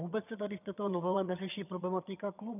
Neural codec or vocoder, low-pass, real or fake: vocoder, 22.05 kHz, 80 mel bands, HiFi-GAN; 3.6 kHz; fake